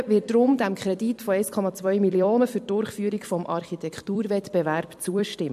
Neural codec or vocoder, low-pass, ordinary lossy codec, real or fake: vocoder, 44.1 kHz, 128 mel bands every 256 samples, BigVGAN v2; 14.4 kHz; MP3, 64 kbps; fake